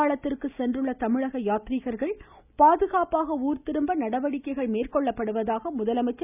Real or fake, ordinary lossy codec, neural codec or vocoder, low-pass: real; none; none; 3.6 kHz